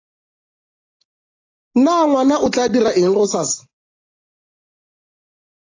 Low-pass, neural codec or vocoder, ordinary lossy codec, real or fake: 7.2 kHz; none; AAC, 32 kbps; real